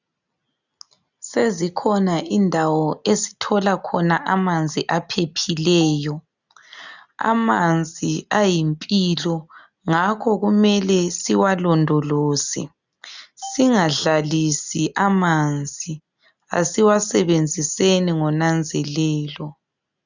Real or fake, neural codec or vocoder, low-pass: real; none; 7.2 kHz